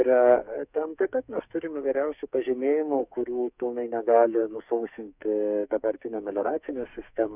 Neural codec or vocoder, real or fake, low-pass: codec, 44.1 kHz, 2.6 kbps, SNAC; fake; 3.6 kHz